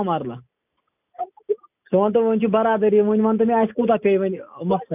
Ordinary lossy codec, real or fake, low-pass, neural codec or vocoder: none; real; 3.6 kHz; none